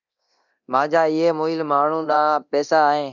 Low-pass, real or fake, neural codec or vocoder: 7.2 kHz; fake; codec, 24 kHz, 0.9 kbps, DualCodec